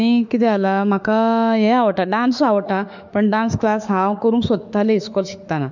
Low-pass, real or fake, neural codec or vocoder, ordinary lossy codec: 7.2 kHz; fake; autoencoder, 48 kHz, 32 numbers a frame, DAC-VAE, trained on Japanese speech; none